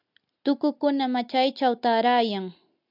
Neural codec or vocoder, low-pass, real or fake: none; 5.4 kHz; real